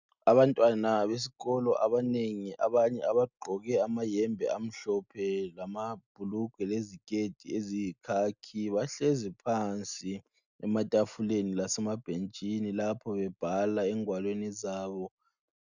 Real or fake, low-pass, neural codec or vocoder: real; 7.2 kHz; none